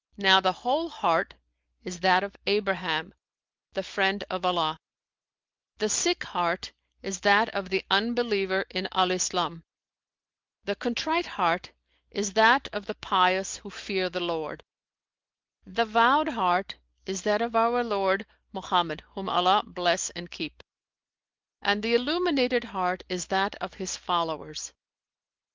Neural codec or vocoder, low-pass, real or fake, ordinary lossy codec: none; 7.2 kHz; real; Opus, 32 kbps